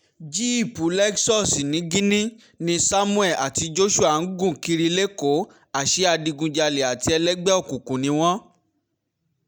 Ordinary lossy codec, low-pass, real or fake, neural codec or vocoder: none; none; real; none